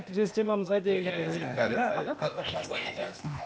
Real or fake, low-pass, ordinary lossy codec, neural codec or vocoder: fake; none; none; codec, 16 kHz, 0.8 kbps, ZipCodec